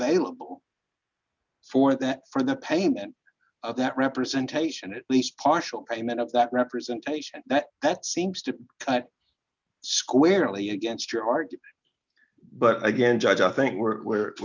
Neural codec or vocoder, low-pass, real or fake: none; 7.2 kHz; real